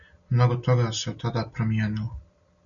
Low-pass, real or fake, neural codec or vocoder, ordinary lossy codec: 7.2 kHz; real; none; MP3, 96 kbps